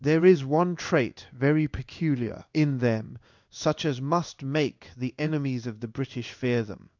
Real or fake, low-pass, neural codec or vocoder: fake; 7.2 kHz; codec, 16 kHz in and 24 kHz out, 1 kbps, XY-Tokenizer